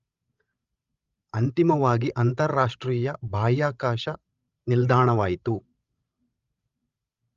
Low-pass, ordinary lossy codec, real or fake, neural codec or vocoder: 7.2 kHz; Opus, 32 kbps; fake; codec, 16 kHz, 16 kbps, FreqCodec, larger model